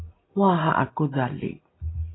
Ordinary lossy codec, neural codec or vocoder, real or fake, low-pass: AAC, 16 kbps; none; real; 7.2 kHz